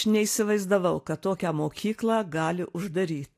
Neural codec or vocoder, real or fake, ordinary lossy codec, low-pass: none; real; AAC, 64 kbps; 14.4 kHz